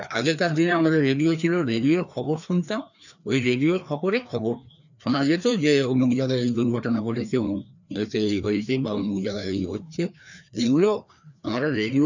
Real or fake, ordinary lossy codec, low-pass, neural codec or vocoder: fake; none; 7.2 kHz; codec, 16 kHz, 2 kbps, FreqCodec, larger model